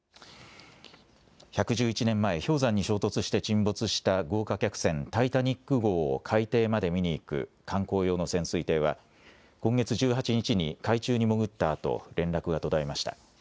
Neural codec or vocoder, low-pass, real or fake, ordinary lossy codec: none; none; real; none